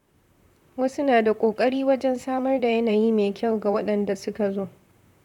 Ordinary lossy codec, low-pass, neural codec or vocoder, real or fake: none; 19.8 kHz; vocoder, 44.1 kHz, 128 mel bands, Pupu-Vocoder; fake